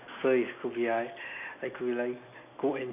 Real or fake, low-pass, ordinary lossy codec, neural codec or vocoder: real; 3.6 kHz; none; none